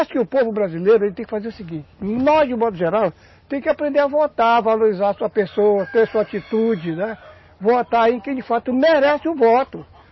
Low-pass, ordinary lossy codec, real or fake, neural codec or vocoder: 7.2 kHz; MP3, 24 kbps; real; none